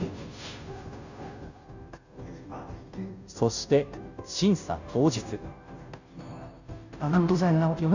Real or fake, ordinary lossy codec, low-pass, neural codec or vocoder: fake; none; 7.2 kHz; codec, 16 kHz, 0.5 kbps, FunCodec, trained on Chinese and English, 25 frames a second